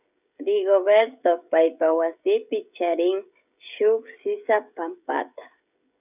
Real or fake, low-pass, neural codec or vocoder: fake; 3.6 kHz; codec, 16 kHz, 16 kbps, FreqCodec, smaller model